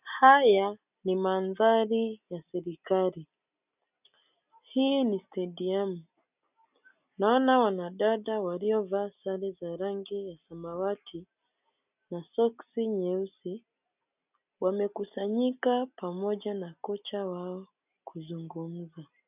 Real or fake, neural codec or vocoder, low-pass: real; none; 3.6 kHz